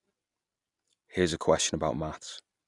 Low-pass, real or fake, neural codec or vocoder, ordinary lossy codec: 10.8 kHz; real; none; none